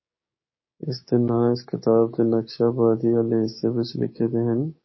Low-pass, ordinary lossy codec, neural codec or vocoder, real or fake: 7.2 kHz; MP3, 24 kbps; codec, 16 kHz, 6 kbps, DAC; fake